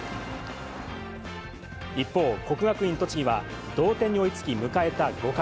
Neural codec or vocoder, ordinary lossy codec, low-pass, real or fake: none; none; none; real